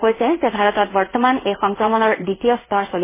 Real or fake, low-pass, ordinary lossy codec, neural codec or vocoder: fake; 3.6 kHz; MP3, 16 kbps; codec, 16 kHz in and 24 kHz out, 1 kbps, XY-Tokenizer